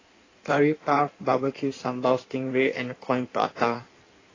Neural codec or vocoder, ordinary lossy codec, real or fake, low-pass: codec, 16 kHz in and 24 kHz out, 1.1 kbps, FireRedTTS-2 codec; AAC, 32 kbps; fake; 7.2 kHz